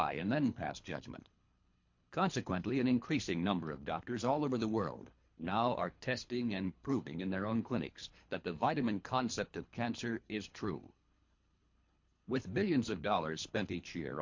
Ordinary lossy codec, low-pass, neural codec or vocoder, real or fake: MP3, 48 kbps; 7.2 kHz; codec, 24 kHz, 3 kbps, HILCodec; fake